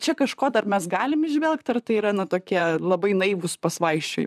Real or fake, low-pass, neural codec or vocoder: fake; 14.4 kHz; vocoder, 44.1 kHz, 128 mel bands, Pupu-Vocoder